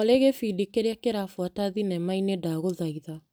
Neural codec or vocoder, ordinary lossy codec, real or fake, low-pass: none; none; real; none